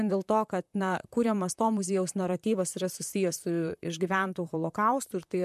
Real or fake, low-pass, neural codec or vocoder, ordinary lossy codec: fake; 14.4 kHz; vocoder, 44.1 kHz, 128 mel bands, Pupu-Vocoder; MP3, 96 kbps